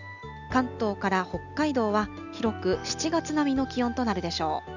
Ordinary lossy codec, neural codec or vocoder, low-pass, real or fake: none; none; 7.2 kHz; real